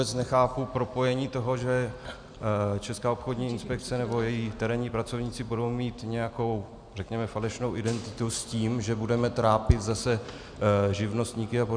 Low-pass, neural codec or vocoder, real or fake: 9.9 kHz; none; real